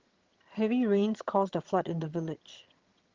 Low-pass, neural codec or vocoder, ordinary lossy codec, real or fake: 7.2 kHz; vocoder, 22.05 kHz, 80 mel bands, HiFi-GAN; Opus, 16 kbps; fake